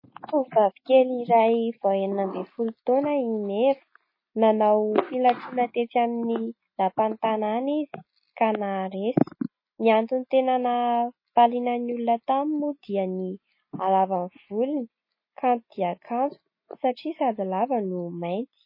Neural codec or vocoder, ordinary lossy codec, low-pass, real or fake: none; MP3, 24 kbps; 5.4 kHz; real